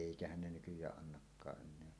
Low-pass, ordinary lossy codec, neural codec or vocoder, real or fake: none; none; none; real